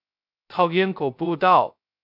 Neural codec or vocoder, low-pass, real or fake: codec, 16 kHz, 0.2 kbps, FocalCodec; 5.4 kHz; fake